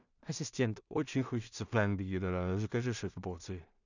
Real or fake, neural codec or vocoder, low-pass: fake; codec, 16 kHz in and 24 kHz out, 0.4 kbps, LongCat-Audio-Codec, two codebook decoder; 7.2 kHz